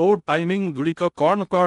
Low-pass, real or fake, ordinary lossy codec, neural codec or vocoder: 10.8 kHz; fake; none; codec, 16 kHz in and 24 kHz out, 0.6 kbps, FocalCodec, streaming, 2048 codes